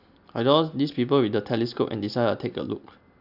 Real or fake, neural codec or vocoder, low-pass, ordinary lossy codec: real; none; 5.4 kHz; none